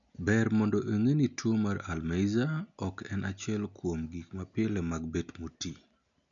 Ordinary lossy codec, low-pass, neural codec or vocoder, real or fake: none; 7.2 kHz; none; real